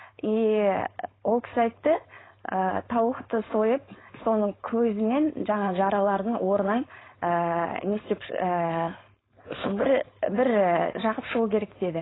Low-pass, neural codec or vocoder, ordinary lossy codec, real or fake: 7.2 kHz; codec, 16 kHz, 4.8 kbps, FACodec; AAC, 16 kbps; fake